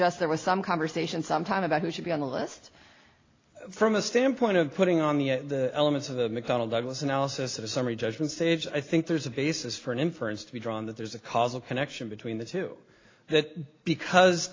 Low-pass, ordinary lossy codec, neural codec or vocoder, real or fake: 7.2 kHz; AAC, 32 kbps; none; real